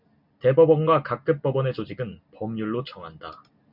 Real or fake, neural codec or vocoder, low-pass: real; none; 5.4 kHz